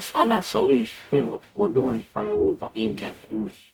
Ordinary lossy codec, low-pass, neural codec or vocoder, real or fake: none; 19.8 kHz; codec, 44.1 kHz, 0.9 kbps, DAC; fake